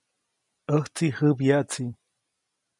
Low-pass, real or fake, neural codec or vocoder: 10.8 kHz; real; none